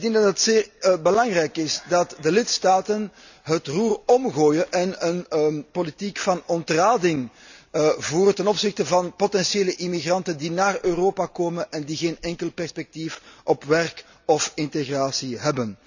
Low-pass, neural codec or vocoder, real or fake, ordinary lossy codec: 7.2 kHz; none; real; none